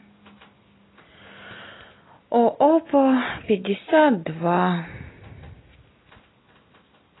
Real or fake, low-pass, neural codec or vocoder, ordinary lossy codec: real; 7.2 kHz; none; AAC, 16 kbps